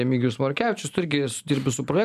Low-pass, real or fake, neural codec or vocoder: 14.4 kHz; real; none